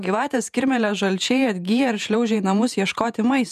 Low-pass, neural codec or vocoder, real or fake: 14.4 kHz; vocoder, 48 kHz, 128 mel bands, Vocos; fake